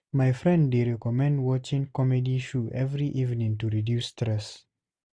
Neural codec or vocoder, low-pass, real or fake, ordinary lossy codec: none; 9.9 kHz; real; none